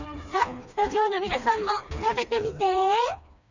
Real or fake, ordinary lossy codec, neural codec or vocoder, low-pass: fake; none; codec, 16 kHz, 2 kbps, FreqCodec, smaller model; 7.2 kHz